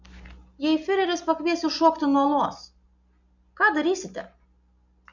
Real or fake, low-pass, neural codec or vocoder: real; 7.2 kHz; none